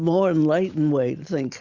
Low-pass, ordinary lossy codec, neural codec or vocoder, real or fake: 7.2 kHz; Opus, 64 kbps; none; real